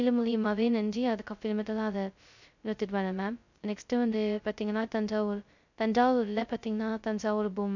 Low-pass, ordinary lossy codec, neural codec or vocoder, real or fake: 7.2 kHz; none; codec, 16 kHz, 0.2 kbps, FocalCodec; fake